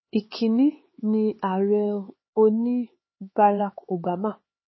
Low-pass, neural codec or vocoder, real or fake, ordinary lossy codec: 7.2 kHz; codec, 16 kHz, 4 kbps, X-Codec, HuBERT features, trained on LibriSpeech; fake; MP3, 24 kbps